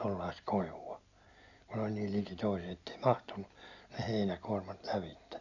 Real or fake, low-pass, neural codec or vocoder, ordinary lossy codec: real; 7.2 kHz; none; none